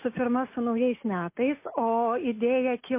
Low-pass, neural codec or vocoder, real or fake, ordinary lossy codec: 3.6 kHz; none; real; MP3, 24 kbps